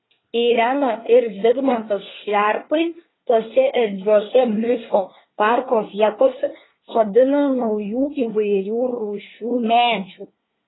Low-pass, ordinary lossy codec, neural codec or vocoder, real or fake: 7.2 kHz; AAC, 16 kbps; codec, 24 kHz, 1 kbps, SNAC; fake